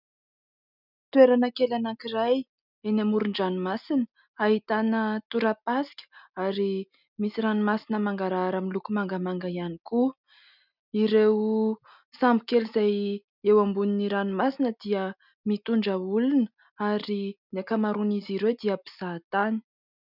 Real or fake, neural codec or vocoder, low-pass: real; none; 5.4 kHz